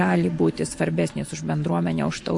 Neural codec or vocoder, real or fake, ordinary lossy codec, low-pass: none; real; MP3, 48 kbps; 10.8 kHz